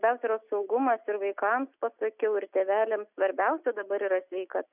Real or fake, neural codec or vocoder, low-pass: real; none; 3.6 kHz